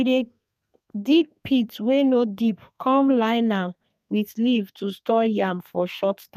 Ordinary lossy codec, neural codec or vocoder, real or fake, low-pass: none; codec, 32 kHz, 1.9 kbps, SNAC; fake; 14.4 kHz